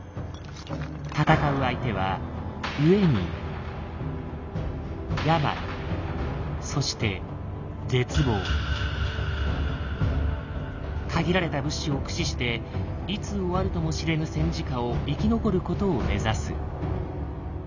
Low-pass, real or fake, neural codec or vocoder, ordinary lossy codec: 7.2 kHz; real; none; none